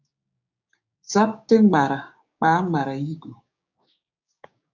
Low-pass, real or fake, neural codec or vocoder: 7.2 kHz; fake; codec, 16 kHz, 6 kbps, DAC